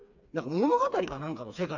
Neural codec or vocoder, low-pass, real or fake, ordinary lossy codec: codec, 16 kHz, 4 kbps, FreqCodec, smaller model; 7.2 kHz; fake; none